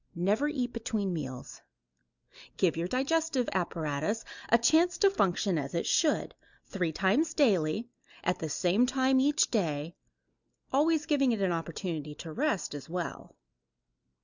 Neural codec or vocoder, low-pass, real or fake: none; 7.2 kHz; real